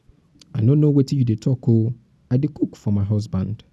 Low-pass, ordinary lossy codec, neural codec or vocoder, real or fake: none; none; none; real